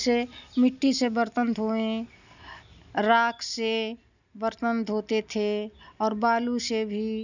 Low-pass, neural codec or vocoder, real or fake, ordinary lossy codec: 7.2 kHz; none; real; none